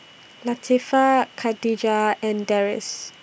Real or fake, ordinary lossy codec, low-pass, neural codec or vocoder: real; none; none; none